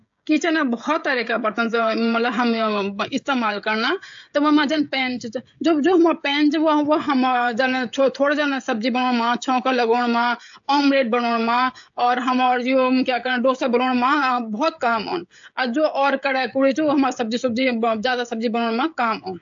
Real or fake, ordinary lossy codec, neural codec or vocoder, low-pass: fake; AAC, 64 kbps; codec, 16 kHz, 16 kbps, FreqCodec, smaller model; 7.2 kHz